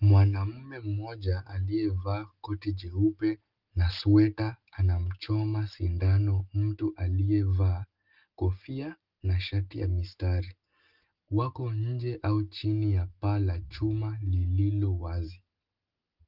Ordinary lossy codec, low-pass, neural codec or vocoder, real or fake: Opus, 32 kbps; 5.4 kHz; none; real